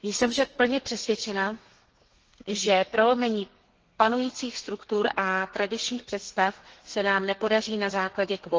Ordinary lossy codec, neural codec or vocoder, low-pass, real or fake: Opus, 16 kbps; codec, 32 kHz, 1.9 kbps, SNAC; 7.2 kHz; fake